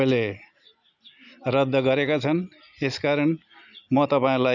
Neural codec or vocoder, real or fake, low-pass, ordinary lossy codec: none; real; 7.2 kHz; none